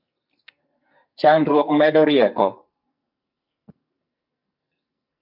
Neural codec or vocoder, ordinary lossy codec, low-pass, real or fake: codec, 44.1 kHz, 2.6 kbps, SNAC; MP3, 48 kbps; 5.4 kHz; fake